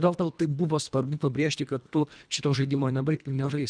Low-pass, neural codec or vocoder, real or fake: 9.9 kHz; codec, 24 kHz, 1.5 kbps, HILCodec; fake